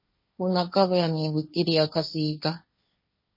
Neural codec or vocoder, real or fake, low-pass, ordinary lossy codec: codec, 16 kHz, 1.1 kbps, Voila-Tokenizer; fake; 5.4 kHz; MP3, 24 kbps